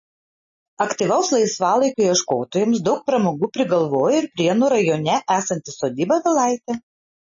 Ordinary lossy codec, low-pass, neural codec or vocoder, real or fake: MP3, 32 kbps; 7.2 kHz; none; real